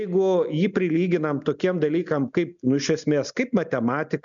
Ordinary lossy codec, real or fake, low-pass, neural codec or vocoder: MP3, 96 kbps; real; 7.2 kHz; none